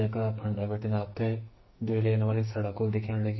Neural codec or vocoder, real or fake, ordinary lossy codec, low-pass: codec, 44.1 kHz, 2.6 kbps, SNAC; fake; MP3, 24 kbps; 7.2 kHz